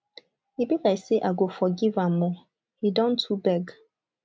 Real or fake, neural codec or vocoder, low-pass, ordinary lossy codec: real; none; none; none